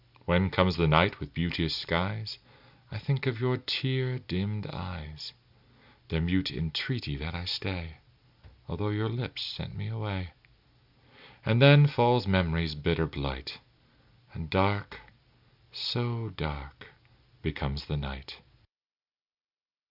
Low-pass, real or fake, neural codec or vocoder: 5.4 kHz; real; none